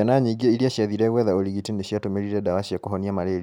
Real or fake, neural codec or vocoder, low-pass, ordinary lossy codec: real; none; 19.8 kHz; none